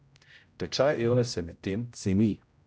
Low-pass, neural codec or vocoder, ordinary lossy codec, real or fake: none; codec, 16 kHz, 0.5 kbps, X-Codec, HuBERT features, trained on general audio; none; fake